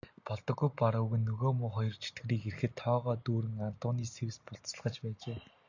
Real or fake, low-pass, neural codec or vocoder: real; 7.2 kHz; none